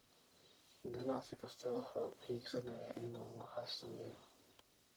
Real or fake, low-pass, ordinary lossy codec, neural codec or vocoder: fake; none; none; codec, 44.1 kHz, 1.7 kbps, Pupu-Codec